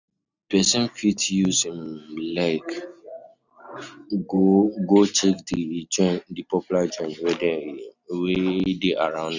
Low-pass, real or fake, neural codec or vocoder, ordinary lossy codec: 7.2 kHz; real; none; none